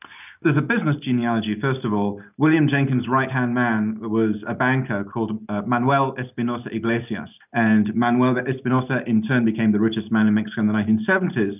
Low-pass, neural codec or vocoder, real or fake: 3.6 kHz; none; real